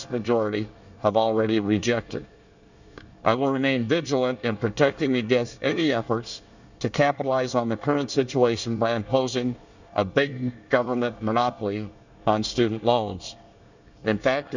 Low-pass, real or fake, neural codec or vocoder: 7.2 kHz; fake; codec, 24 kHz, 1 kbps, SNAC